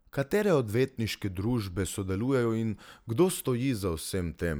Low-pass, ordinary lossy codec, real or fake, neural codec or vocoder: none; none; real; none